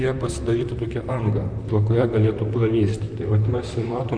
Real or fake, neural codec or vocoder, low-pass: fake; vocoder, 44.1 kHz, 128 mel bands, Pupu-Vocoder; 9.9 kHz